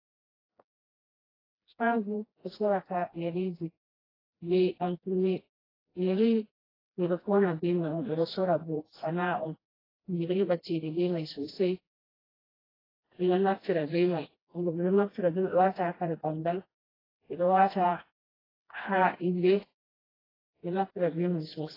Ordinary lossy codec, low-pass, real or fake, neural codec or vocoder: AAC, 24 kbps; 5.4 kHz; fake; codec, 16 kHz, 1 kbps, FreqCodec, smaller model